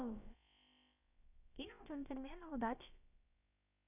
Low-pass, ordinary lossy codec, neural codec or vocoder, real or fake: 3.6 kHz; none; codec, 16 kHz, about 1 kbps, DyCAST, with the encoder's durations; fake